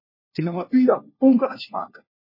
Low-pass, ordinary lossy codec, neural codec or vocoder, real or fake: 5.4 kHz; MP3, 24 kbps; codec, 16 kHz, 1 kbps, FunCodec, trained on LibriTTS, 50 frames a second; fake